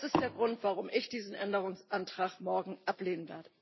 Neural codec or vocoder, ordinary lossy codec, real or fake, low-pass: none; MP3, 24 kbps; real; 7.2 kHz